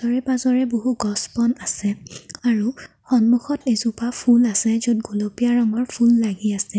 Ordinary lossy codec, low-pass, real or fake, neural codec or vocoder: none; none; real; none